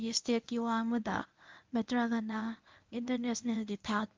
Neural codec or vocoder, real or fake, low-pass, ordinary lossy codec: codec, 24 kHz, 0.9 kbps, WavTokenizer, medium speech release version 1; fake; 7.2 kHz; Opus, 24 kbps